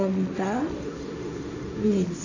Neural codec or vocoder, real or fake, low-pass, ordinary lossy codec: codec, 16 kHz, 1.1 kbps, Voila-Tokenizer; fake; 7.2 kHz; none